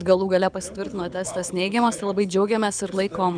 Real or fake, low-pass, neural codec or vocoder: fake; 9.9 kHz; codec, 24 kHz, 6 kbps, HILCodec